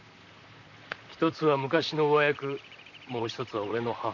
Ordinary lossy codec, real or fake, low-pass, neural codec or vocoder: none; fake; 7.2 kHz; vocoder, 44.1 kHz, 128 mel bands, Pupu-Vocoder